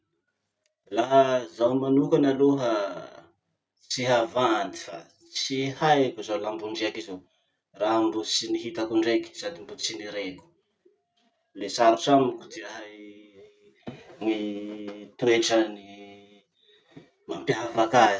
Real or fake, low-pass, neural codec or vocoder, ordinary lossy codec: real; none; none; none